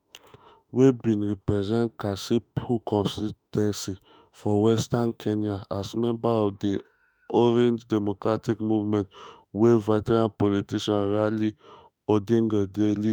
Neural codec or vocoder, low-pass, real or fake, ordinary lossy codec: autoencoder, 48 kHz, 32 numbers a frame, DAC-VAE, trained on Japanese speech; none; fake; none